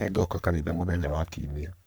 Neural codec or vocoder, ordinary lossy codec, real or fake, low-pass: codec, 44.1 kHz, 3.4 kbps, Pupu-Codec; none; fake; none